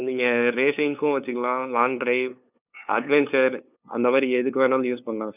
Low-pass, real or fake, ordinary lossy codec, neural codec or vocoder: 3.6 kHz; fake; none; codec, 16 kHz, 2 kbps, FunCodec, trained on LibriTTS, 25 frames a second